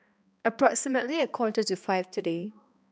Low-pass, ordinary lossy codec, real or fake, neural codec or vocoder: none; none; fake; codec, 16 kHz, 2 kbps, X-Codec, HuBERT features, trained on balanced general audio